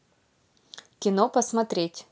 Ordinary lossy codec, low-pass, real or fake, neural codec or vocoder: none; none; real; none